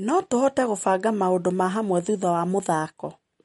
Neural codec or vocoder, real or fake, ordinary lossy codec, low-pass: none; real; MP3, 48 kbps; 19.8 kHz